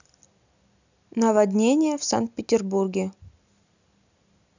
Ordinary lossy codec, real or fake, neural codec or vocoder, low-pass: none; real; none; 7.2 kHz